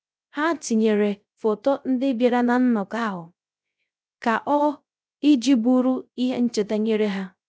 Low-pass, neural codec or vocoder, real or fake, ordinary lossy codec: none; codec, 16 kHz, 0.3 kbps, FocalCodec; fake; none